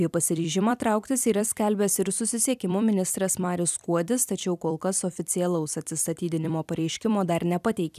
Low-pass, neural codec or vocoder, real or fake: 14.4 kHz; vocoder, 44.1 kHz, 128 mel bands every 256 samples, BigVGAN v2; fake